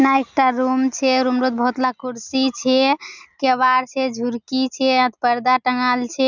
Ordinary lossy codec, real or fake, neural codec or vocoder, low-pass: none; real; none; 7.2 kHz